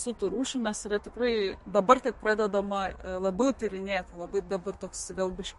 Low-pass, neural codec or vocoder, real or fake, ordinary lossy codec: 14.4 kHz; codec, 32 kHz, 1.9 kbps, SNAC; fake; MP3, 48 kbps